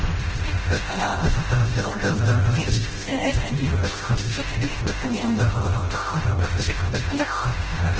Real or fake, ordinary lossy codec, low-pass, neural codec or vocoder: fake; Opus, 16 kbps; 7.2 kHz; codec, 16 kHz, 0.5 kbps, FreqCodec, smaller model